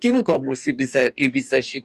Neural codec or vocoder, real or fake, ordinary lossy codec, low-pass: codec, 44.1 kHz, 2.6 kbps, DAC; fake; AAC, 96 kbps; 14.4 kHz